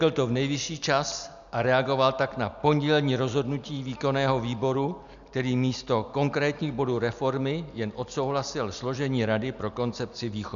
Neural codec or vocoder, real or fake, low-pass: none; real; 7.2 kHz